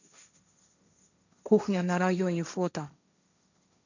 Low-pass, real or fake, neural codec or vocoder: 7.2 kHz; fake; codec, 16 kHz, 1.1 kbps, Voila-Tokenizer